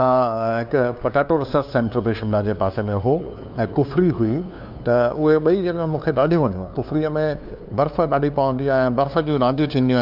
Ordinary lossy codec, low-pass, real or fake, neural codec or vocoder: none; 5.4 kHz; fake; codec, 16 kHz, 2 kbps, FunCodec, trained on LibriTTS, 25 frames a second